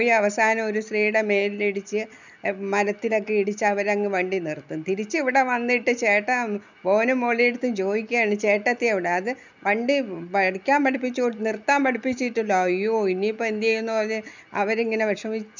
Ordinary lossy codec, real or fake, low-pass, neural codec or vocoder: none; real; 7.2 kHz; none